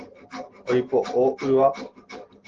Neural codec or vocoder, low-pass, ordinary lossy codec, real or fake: none; 7.2 kHz; Opus, 16 kbps; real